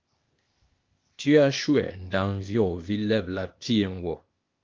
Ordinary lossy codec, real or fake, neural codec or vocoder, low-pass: Opus, 32 kbps; fake; codec, 16 kHz, 0.8 kbps, ZipCodec; 7.2 kHz